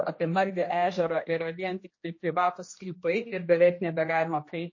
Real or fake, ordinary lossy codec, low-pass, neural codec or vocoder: fake; MP3, 32 kbps; 7.2 kHz; codec, 16 kHz, 1 kbps, X-Codec, HuBERT features, trained on general audio